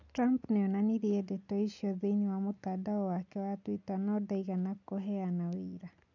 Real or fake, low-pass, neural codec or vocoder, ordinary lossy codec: real; 7.2 kHz; none; none